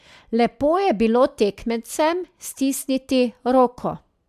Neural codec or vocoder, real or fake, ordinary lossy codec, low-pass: none; real; AAC, 96 kbps; 14.4 kHz